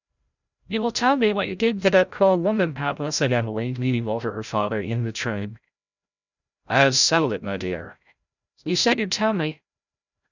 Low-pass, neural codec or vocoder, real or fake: 7.2 kHz; codec, 16 kHz, 0.5 kbps, FreqCodec, larger model; fake